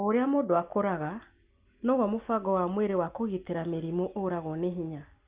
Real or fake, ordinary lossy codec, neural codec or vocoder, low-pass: real; Opus, 32 kbps; none; 3.6 kHz